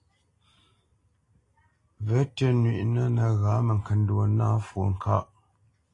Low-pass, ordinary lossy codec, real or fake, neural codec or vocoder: 10.8 kHz; AAC, 32 kbps; real; none